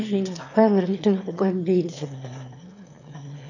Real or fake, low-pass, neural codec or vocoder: fake; 7.2 kHz; autoencoder, 22.05 kHz, a latent of 192 numbers a frame, VITS, trained on one speaker